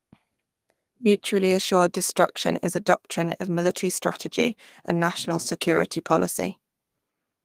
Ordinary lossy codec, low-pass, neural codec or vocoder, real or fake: Opus, 32 kbps; 14.4 kHz; codec, 32 kHz, 1.9 kbps, SNAC; fake